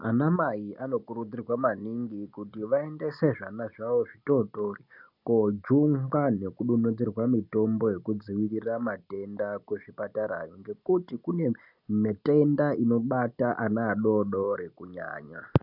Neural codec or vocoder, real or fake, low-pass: none; real; 5.4 kHz